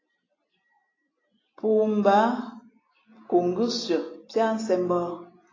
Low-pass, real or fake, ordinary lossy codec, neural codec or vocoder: 7.2 kHz; real; AAC, 32 kbps; none